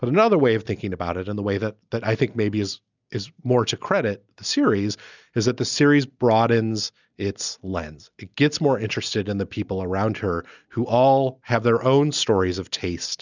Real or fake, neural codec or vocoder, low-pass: real; none; 7.2 kHz